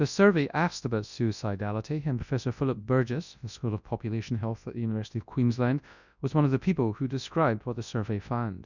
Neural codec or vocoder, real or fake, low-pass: codec, 24 kHz, 0.9 kbps, WavTokenizer, large speech release; fake; 7.2 kHz